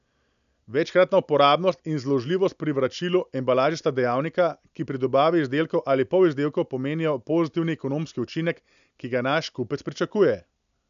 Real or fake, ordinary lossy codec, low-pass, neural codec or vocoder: real; none; 7.2 kHz; none